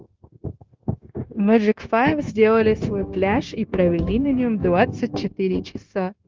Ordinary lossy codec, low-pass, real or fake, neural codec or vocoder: Opus, 32 kbps; 7.2 kHz; fake; codec, 16 kHz, 0.9 kbps, LongCat-Audio-Codec